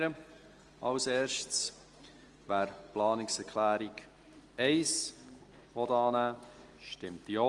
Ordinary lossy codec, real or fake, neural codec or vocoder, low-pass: Opus, 24 kbps; real; none; 10.8 kHz